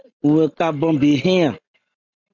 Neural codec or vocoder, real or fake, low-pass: none; real; 7.2 kHz